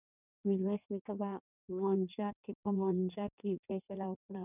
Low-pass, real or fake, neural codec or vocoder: 3.6 kHz; fake; codec, 16 kHz in and 24 kHz out, 1.1 kbps, FireRedTTS-2 codec